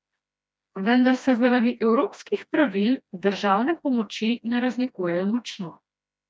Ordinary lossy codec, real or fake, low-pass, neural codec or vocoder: none; fake; none; codec, 16 kHz, 1 kbps, FreqCodec, smaller model